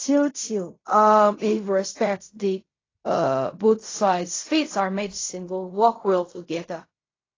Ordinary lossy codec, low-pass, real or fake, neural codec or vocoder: AAC, 32 kbps; 7.2 kHz; fake; codec, 16 kHz in and 24 kHz out, 0.4 kbps, LongCat-Audio-Codec, fine tuned four codebook decoder